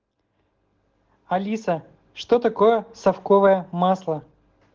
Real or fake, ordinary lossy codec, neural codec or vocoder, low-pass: real; Opus, 16 kbps; none; 7.2 kHz